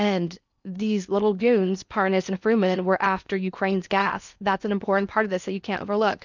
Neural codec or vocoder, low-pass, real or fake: codec, 16 kHz in and 24 kHz out, 0.8 kbps, FocalCodec, streaming, 65536 codes; 7.2 kHz; fake